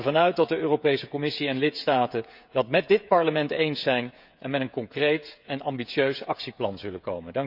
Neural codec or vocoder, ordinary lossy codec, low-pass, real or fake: codec, 16 kHz, 16 kbps, FreqCodec, smaller model; none; 5.4 kHz; fake